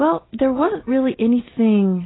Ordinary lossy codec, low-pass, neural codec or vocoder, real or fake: AAC, 16 kbps; 7.2 kHz; none; real